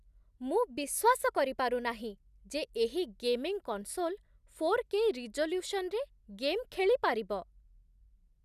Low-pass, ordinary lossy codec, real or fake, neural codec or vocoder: 14.4 kHz; none; real; none